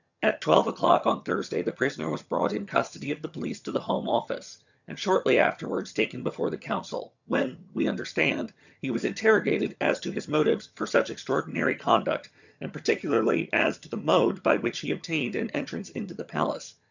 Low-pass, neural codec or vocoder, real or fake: 7.2 kHz; vocoder, 22.05 kHz, 80 mel bands, HiFi-GAN; fake